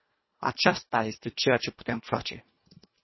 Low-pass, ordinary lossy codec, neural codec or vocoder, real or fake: 7.2 kHz; MP3, 24 kbps; codec, 24 kHz, 1.5 kbps, HILCodec; fake